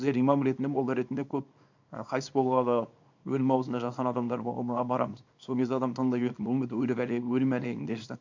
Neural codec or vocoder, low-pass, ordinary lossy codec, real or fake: codec, 24 kHz, 0.9 kbps, WavTokenizer, small release; 7.2 kHz; MP3, 64 kbps; fake